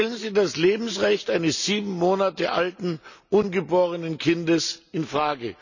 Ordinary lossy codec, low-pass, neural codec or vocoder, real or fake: none; 7.2 kHz; none; real